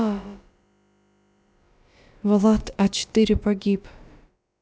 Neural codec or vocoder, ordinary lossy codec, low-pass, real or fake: codec, 16 kHz, about 1 kbps, DyCAST, with the encoder's durations; none; none; fake